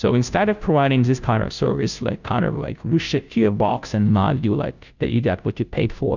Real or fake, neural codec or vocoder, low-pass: fake; codec, 16 kHz, 0.5 kbps, FunCodec, trained on Chinese and English, 25 frames a second; 7.2 kHz